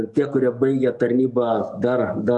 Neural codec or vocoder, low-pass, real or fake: codec, 44.1 kHz, 7.8 kbps, DAC; 10.8 kHz; fake